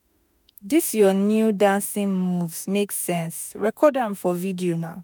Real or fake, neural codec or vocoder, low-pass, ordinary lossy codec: fake; autoencoder, 48 kHz, 32 numbers a frame, DAC-VAE, trained on Japanese speech; none; none